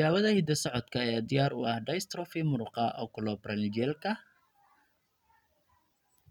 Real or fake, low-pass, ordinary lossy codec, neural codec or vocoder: real; 19.8 kHz; none; none